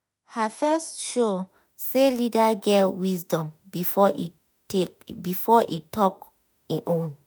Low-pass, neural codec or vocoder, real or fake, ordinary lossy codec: none; autoencoder, 48 kHz, 32 numbers a frame, DAC-VAE, trained on Japanese speech; fake; none